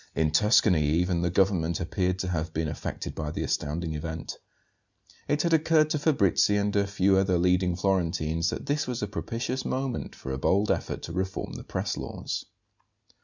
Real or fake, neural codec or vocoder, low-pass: real; none; 7.2 kHz